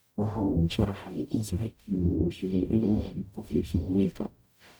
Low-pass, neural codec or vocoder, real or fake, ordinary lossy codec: none; codec, 44.1 kHz, 0.9 kbps, DAC; fake; none